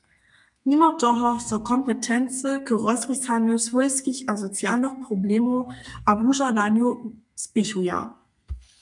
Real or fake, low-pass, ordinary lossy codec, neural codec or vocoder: fake; 10.8 kHz; MP3, 96 kbps; codec, 32 kHz, 1.9 kbps, SNAC